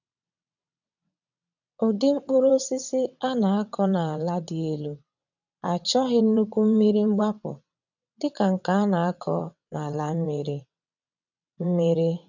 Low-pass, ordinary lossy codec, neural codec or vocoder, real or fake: 7.2 kHz; none; vocoder, 22.05 kHz, 80 mel bands, WaveNeXt; fake